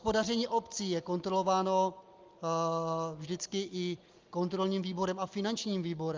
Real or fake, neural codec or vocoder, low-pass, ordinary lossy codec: real; none; 7.2 kHz; Opus, 32 kbps